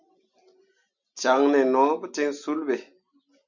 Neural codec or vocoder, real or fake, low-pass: none; real; 7.2 kHz